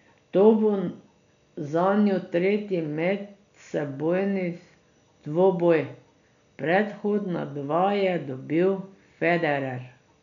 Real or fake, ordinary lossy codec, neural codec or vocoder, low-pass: real; none; none; 7.2 kHz